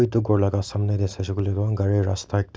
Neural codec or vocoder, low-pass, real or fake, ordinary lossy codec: none; none; real; none